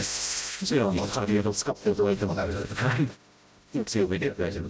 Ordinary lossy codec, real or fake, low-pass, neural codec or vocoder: none; fake; none; codec, 16 kHz, 0.5 kbps, FreqCodec, smaller model